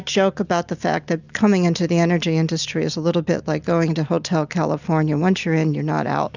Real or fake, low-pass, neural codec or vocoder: real; 7.2 kHz; none